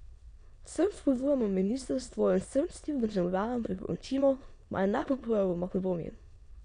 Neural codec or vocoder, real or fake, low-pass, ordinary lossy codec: autoencoder, 22.05 kHz, a latent of 192 numbers a frame, VITS, trained on many speakers; fake; 9.9 kHz; none